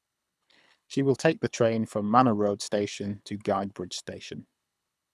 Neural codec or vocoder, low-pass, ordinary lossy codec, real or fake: codec, 24 kHz, 6 kbps, HILCodec; none; none; fake